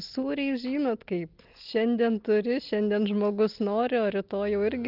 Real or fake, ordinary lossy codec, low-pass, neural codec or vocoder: real; Opus, 32 kbps; 5.4 kHz; none